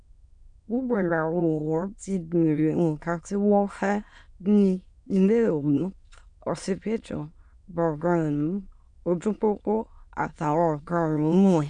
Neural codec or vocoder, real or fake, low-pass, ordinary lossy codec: autoencoder, 22.05 kHz, a latent of 192 numbers a frame, VITS, trained on many speakers; fake; 9.9 kHz; none